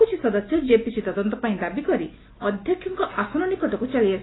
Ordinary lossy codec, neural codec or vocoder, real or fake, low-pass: AAC, 16 kbps; none; real; 7.2 kHz